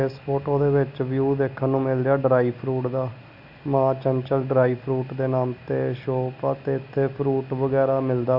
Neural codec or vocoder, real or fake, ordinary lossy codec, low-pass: none; real; none; 5.4 kHz